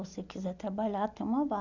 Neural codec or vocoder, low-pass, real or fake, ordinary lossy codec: none; 7.2 kHz; real; none